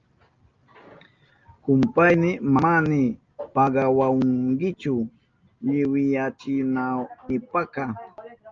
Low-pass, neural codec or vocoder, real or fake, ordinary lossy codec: 7.2 kHz; none; real; Opus, 24 kbps